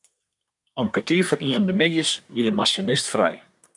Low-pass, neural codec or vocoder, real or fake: 10.8 kHz; codec, 24 kHz, 1 kbps, SNAC; fake